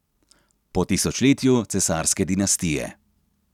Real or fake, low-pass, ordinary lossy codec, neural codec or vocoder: real; 19.8 kHz; none; none